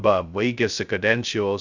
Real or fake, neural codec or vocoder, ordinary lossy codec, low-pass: fake; codec, 16 kHz, 0.2 kbps, FocalCodec; Opus, 64 kbps; 7.2 kHz